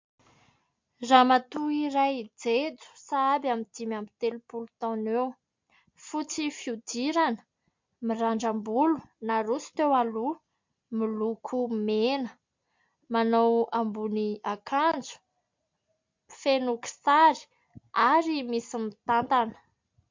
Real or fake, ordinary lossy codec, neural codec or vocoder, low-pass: real; MP3, 48 kbps; none; 7.2 kHz